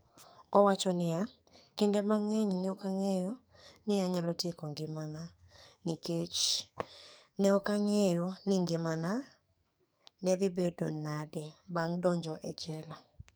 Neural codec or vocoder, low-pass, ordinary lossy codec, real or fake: codec, 44.1 kHz, 2.6 kbps, SNAC; none; none; fake